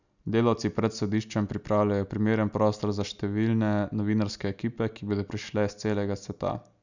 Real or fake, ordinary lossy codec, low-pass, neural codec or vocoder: real; none; 7.2 kHz; none